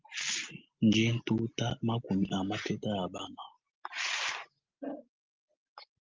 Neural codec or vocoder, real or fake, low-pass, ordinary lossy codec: none; real; 7.2 kHz; Opus, 24 kbps